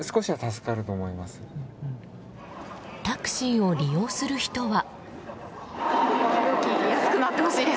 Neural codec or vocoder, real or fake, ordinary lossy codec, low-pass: none; real; none; none